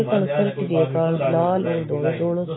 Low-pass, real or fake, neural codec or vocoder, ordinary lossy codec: 7.2 kHz; real; none; AAC, 16 kbps